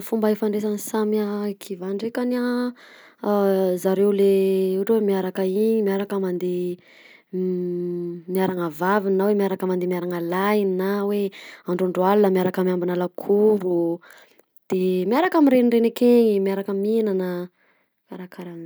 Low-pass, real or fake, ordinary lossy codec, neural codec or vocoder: none; real; none; none